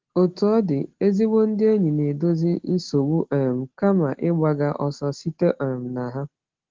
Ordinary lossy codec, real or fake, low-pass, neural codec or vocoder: Opus, 16 kbps; real; 7.2 kHz; none